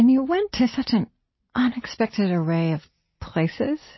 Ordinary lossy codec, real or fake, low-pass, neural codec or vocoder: MP3, 24 kbps; real; 7.2 kHz; none